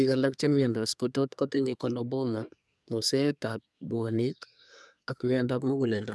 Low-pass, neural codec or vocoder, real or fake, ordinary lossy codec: none; codec, 24 kHz, 1 kbps, SNAC; fake; none